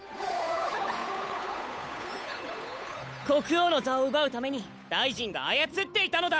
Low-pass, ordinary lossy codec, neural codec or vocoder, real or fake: none; none; codec, 16 kHz, 8 kbps, FunCodec, trained on Chinese and English, 25 frames a second; fake